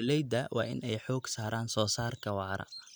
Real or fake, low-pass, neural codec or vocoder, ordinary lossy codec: real; none; none; none